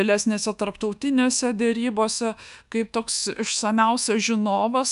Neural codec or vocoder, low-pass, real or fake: codec, 24 kHz, 1.2 kbps, DualCodec; 10.8 kHz; fake